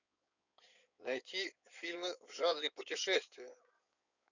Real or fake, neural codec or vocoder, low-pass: fake; codec, 16 kHz in and 24 kHz out, 2.2 kbps, FireRedTTS-2 codec; 7.2 kHz